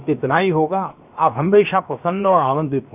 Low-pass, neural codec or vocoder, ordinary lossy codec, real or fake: 3.6 kHz; codec, 16 kHz, 0.7 kbps, FocalCodec; none; fake